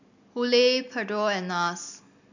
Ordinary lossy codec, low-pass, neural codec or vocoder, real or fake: none; 7.2 kHz; none; real